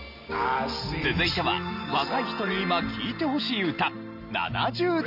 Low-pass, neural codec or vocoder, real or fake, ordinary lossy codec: 5.4 kHz; none; real; none